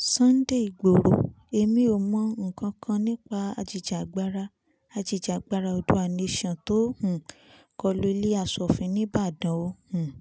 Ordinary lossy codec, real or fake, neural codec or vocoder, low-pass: none; real; none; none